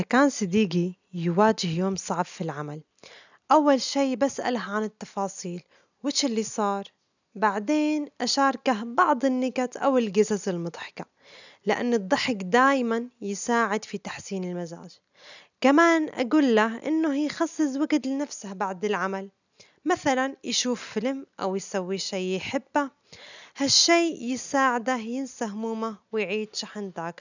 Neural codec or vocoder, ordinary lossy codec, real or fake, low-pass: none; none; real; 7.2 kHz